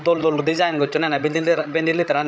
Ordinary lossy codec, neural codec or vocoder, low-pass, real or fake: none; codec, 16 kHz, 16 kbps, FreqCodec, larger model; none; fake